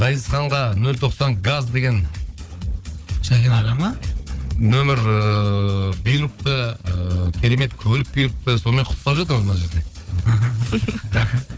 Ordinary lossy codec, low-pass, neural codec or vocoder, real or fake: none; none; codec, 16 kHz, 4 kbps, FunCodec, trained on Chinese and English, 50 frames a second; fake